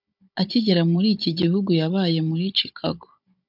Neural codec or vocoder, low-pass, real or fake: codec, 16 kHz, 16 kbps, FunCodec, trained on Chinese and English, 50 frames a second; 5.4 kHz; fake